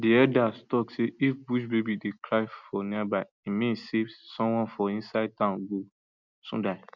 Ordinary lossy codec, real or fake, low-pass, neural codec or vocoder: none; real; 7.2 kHz; none